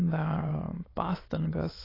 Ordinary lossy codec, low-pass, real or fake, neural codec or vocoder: AAC, 24 kbps; 5.4 kHz; fake; autoencoder, 22.05 kHz, a latent of 192 numbers a frame, VITS, trained on many speakers